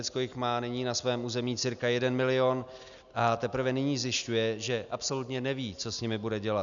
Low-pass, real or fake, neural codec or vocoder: 7.2 kHz; real; none